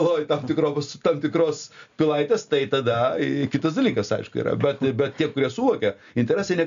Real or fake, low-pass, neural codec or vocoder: real; 7.2 kHz; none